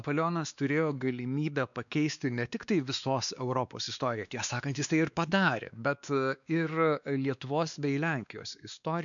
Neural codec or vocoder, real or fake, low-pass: codec, 16 kHz, 2 kbps, X-Codec, WavLM features, trained on Multilingual LibriSpeech; fake; 7.2 kHz